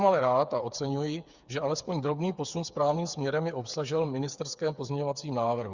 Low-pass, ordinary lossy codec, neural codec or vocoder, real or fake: 7.2 kHz; Opus, 64 kbps; codec, 16 kHz, 8 kbps, FreqCodec, smaller model; fake